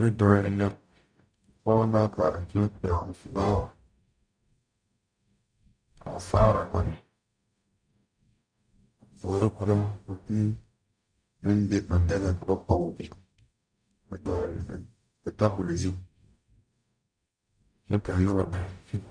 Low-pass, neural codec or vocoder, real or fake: 9.9 kHz; codec, 44.1 kHz, 0.9 kbps, DAC; fake